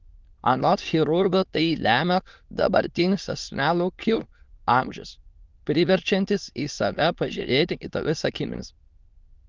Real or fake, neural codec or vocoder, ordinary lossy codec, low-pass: fake; autoencoder, 22.05 kHz, a latent of 192 numbers a frame, VITS, trained on many speakers; Opus, 32 kbps; 7.2 kHz